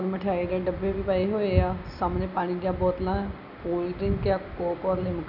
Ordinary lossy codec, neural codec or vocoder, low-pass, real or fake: none; none; 5.4 kHz; real